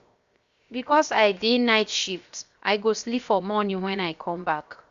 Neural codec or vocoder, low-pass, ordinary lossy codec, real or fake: codec, 16 kHz, 0.7 kbps, FocalCodec; 7.2 kHz; Opus, 64 kbps; fake